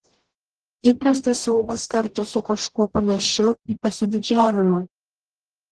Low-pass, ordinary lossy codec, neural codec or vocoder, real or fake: 10.8 kHz; Opus, 16 kbps; codec, 44.1 kHz, 0.9 kbps, DAC; fake